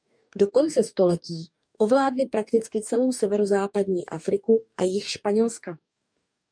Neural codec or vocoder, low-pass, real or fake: codec, 44.1 kHz, 2.6 kbps, DAC; 9.9 kHz; fake